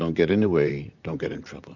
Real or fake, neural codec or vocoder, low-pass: fake; vocoder, 44.1 kHz, 128 mel bands, Pupu-Vocoder; 7.2 kHz